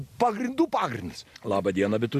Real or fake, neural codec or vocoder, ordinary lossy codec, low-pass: real; none; AAC, 96 kbps; 14.4 kHz